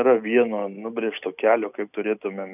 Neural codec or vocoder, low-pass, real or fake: none; 3.6 kHz; real